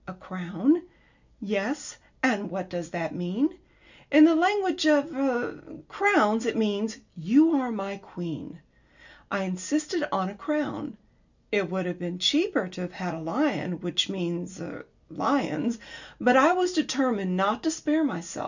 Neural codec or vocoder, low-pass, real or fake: none; 7.2 kHz; real